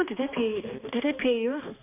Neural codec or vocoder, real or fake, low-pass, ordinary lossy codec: codec, 16 kHz, 2 kbps, X-Codec, HuBERT features, trained on balanced general audio; fake; 3.6 kHz; none